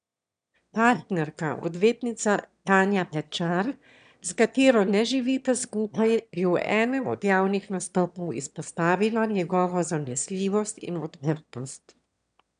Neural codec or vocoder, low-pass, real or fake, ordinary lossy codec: autoencoder, 22.05 kHz, a latent of 192 numbers a frame, VITS, trained on one speaker; 9.9 kHz; fake; none